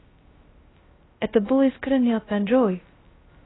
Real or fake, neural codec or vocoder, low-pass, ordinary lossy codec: fake; codec, 16 kHz, 0.2 kbps, FocalCodec; 7.2 kHz; AAC, 16 kbps